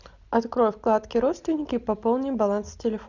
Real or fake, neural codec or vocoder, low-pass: real; none; 7.2 kHz